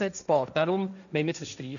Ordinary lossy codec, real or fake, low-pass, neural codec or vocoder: none; fake; 7.2 kHz; codec, 16 kHz, 1.1 kbps, Voila-Tokenizer